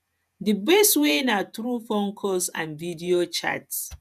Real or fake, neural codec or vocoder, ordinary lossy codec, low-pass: fake; vocoder, 48 kHz, 128 mel bands, Vocos; none; 14.4 kHz